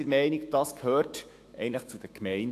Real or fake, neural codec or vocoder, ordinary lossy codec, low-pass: fake; autoencoder, 48 kHz, 128 numbers a frame, DAC-VAE, trained on Japanese speech; AAC, 64 kbps; 14.4 kHz